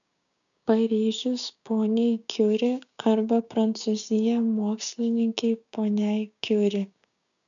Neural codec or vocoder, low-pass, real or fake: codec, 16 kHz, 6 kbps, DAC; 7.2 kHz; fake